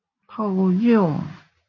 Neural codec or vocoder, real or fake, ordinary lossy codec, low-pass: none; real; MP3, 48 kbps; 7.2 kHz